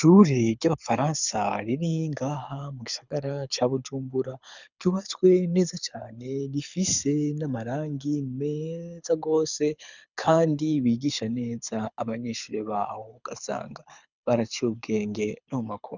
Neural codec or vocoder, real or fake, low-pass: codec, 24 kHz, 6 kbps, HILCodec; fake; 7.2 kHz